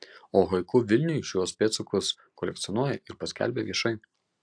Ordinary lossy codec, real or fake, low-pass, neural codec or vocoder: AAC, 64 kbps; real; 9.9 kHz; none